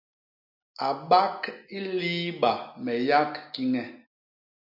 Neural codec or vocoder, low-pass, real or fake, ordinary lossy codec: none; 5.4 kHz; real; MP3, 48 kbps